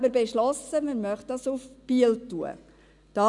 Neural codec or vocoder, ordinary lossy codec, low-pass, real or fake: none; none; 10.8 kHz; real